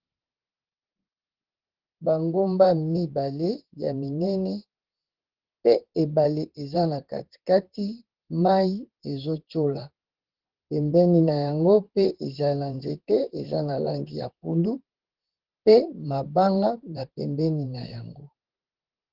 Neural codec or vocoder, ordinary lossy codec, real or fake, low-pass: vocoder, 44.1 kHz, 128 mel bands, Pupu-Vocoder; Opus, 16 kbps; fake; 5.4 kHz